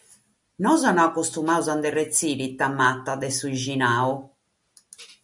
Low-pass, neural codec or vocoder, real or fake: 10.8 kHz; none; real